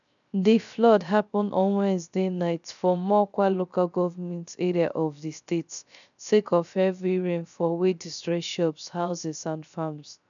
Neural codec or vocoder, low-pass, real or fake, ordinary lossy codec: codec, 16 kHz, 0.3 kbps, FocalCodec; 7.2 kHz; fake; none